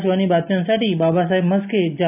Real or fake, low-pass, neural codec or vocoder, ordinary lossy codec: real; 3.6 kHz; none; AAC, 32 kbps